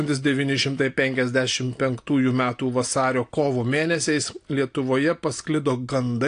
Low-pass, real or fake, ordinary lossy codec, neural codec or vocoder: 9.9 kHz; real; AAC, 48 kbps; none